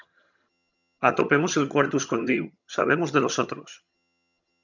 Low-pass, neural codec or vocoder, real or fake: 7.2 kHz; vocoder, 22.05 kHz, 80 mel bands, HiFi-GAN; fake